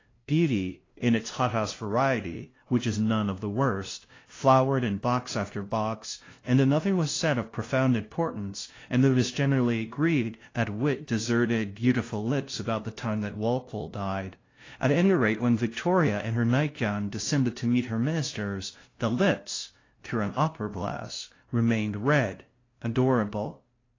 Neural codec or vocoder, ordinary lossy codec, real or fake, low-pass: codec, 16 kHz, 0.5 kbps, FunCodec, trained on LibriTTS, 25 frames a second; AAC, 32 kbps; fake; 7.2 kHz